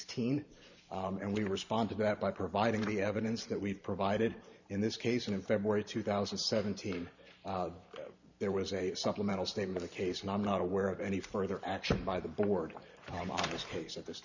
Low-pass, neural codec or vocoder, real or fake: 7.2 kHz; vocoder, 44.1 kHz, 128 mel bands every 512 samples, BigVGAN v2; fake